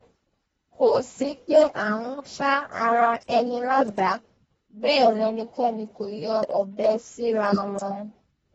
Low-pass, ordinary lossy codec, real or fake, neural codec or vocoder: 10.8 kHz; AAC, 24 kbps; fake; codec, 24 kHz, 1.5 kbps, HILCodec